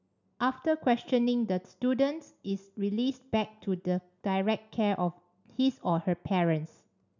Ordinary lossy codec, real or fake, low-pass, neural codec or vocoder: none; real; 7.2 kHz; none